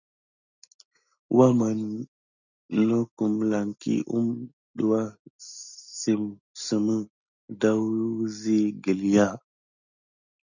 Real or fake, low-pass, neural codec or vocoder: real; 7.2 kHz; none